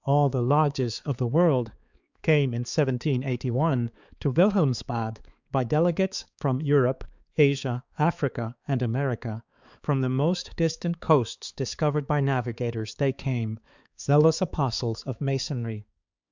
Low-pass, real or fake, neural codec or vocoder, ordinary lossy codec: 7.2 kHz; fake; codec, 16 kHz, 4 kbps, X-Codec, HuBERT features, trained on balanced general audio; Opus, 64 kbps